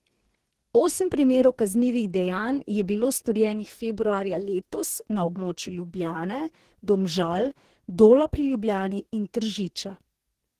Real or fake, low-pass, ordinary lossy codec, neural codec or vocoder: fake; 14.4 kHz; Opus, 16 kbps; codec, 44.1 kHz, 2.6 kbps, DAC